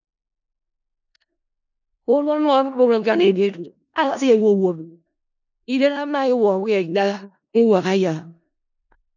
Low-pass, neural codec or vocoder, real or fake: 7.2 kHz; codec, 16 kHz in and 24 kHz out, 0.4 kbps, LongCat-Audio-Codec, four codebook decoder; fake